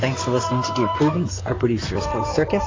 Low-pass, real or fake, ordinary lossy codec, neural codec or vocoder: 7.2 kHz; fake; AAC, 32 kbps; codec, 16 kHz in and 24 kHz out, 2.2 kbps, FireRedTTS-2 codec